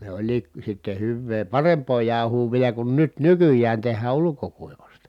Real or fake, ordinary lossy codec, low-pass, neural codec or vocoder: real; none; 19.8 kHz; none